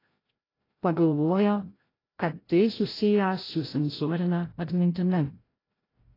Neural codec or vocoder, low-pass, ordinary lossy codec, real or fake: codec, 16 kHz, 0.5 kbps, FreqCodec, larger model; 5.4 kHz; AAC, 24 kbps; fake